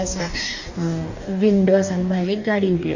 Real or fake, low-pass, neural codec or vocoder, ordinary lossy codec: fake; 7.2 kHz; codec, 44.1 kHz, 2.6 kbps, DAC; none